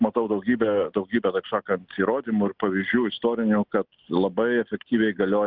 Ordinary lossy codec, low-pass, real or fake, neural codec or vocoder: Opus, 24 kbps; 5.4 kHz; real; none